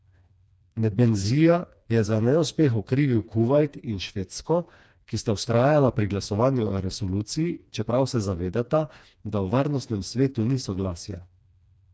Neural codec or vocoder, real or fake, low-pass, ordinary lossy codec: codec, 16 kHz, 2 kbps, FreqCodec, smaller model; fake; none; none